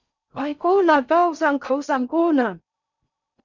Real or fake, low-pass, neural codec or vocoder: fake; 7.2 kHz; codec, 16 kHz in and 24 kHz out, 0.6 kbps, FocalCodec, streaming, 4096 codes